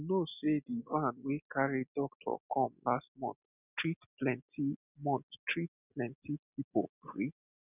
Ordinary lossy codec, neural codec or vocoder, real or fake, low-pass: none; none; real; 3.6 kHz